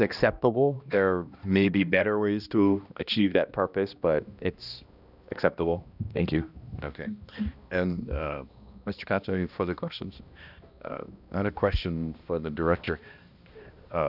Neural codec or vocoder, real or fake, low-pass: codec, 16 kHz, 1 kbps, X-Codec, HuBERT features, trained on balanced general audio; fake; 5.4 kHz